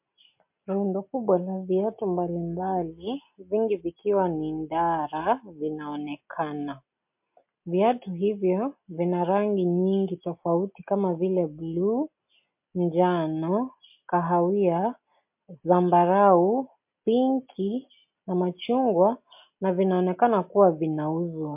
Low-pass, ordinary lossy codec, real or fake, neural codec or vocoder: 3.6 kHz; MP3, 32 kbps; real; none